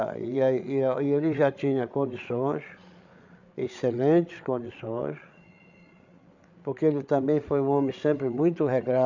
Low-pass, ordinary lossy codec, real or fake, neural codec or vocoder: 7.2 kHz; none; fake; codec, 16 kHz, 8 kbps, FreqCodec, larger model